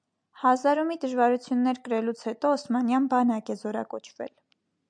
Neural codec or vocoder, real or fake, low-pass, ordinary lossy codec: none; real; 9.9 kHz; MP3, 96 kbps